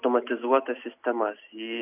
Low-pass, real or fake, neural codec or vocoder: 3.6 kHz; real; none